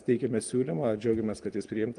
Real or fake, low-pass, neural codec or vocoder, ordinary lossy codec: real; 9.9 kHz; none; Opus, 24 kbps